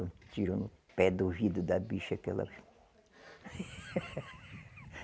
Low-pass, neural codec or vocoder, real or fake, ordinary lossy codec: none; none; real; none